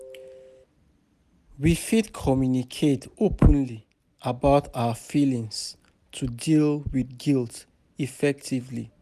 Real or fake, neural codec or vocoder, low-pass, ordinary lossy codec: real; none; 14.4 kHz; none